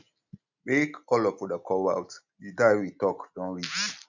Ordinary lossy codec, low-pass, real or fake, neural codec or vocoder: none; 7.2 kHz; real; none